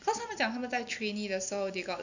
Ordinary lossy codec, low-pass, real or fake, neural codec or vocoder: none; 7.2 kHz; real; none